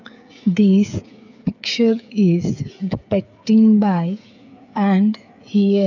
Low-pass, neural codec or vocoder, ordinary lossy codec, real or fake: 7.2 kHz; codec, 16 kHz, 8 kbps, FreqCodec, smaller model; none; fake